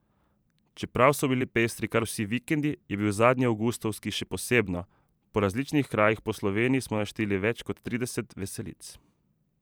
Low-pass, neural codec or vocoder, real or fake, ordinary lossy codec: none; vocoder, 44.1 kHz, 128 mel bands every 512 samples, BigVGAN v2; fake; none